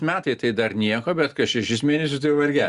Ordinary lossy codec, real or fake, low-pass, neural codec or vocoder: Opus, 64 kbps; real; 10.8 kHz; none